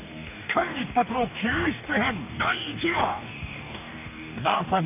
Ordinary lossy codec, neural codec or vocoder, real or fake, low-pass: none; codec, 44.1 kHz, 2.6 kbps, DAC; fake; 3.6 kHz